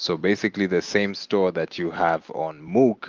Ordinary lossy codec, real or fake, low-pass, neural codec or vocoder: Opus, 24 kbps; real; 7.2 kHz; none